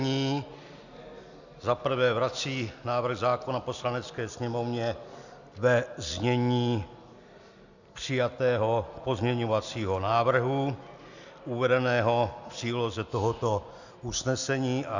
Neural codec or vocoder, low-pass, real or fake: none; 7.2 kHz; real